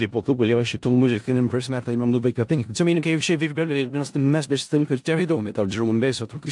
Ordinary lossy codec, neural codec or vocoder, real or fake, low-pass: AAC, 64 kbps; codec, 16 kHz in and 24 kHz out, 0.4 kbps, LongCat-Audio-Codec, four codebook decoder; fake; 10.8 kHz